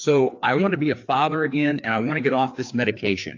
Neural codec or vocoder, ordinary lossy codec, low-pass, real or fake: codec, 16 kHz, 2 kbps, FreqCodec, larger model; AAC, 48 kbps; 7.2 kHz; fake